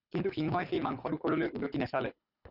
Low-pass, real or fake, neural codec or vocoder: 5.4 kHz; fake; codec, 24 kHz, 6 kbps, HILCodec